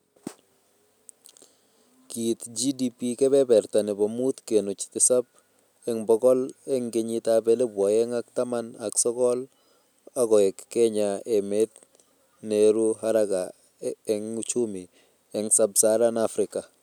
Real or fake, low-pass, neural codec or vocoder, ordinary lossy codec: real; 19.8 kHz; none; none